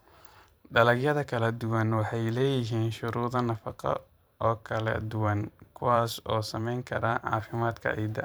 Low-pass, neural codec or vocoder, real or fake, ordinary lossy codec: none; vocoder, 44.1 kHz, 128 mel bands every 512 samples, BigVGAN v2; fake; none